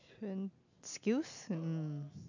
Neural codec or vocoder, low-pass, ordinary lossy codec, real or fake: none; 7.2 kHz; none; real